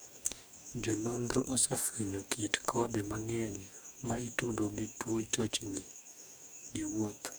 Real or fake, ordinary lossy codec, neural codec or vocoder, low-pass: fake; none; codec, 44.1 kHz, 2.6 kbps, DAC; none